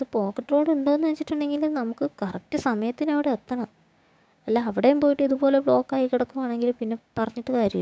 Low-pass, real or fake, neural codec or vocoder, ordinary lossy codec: none; fake; codec, 16 kHz, 6 kbps, DAC; none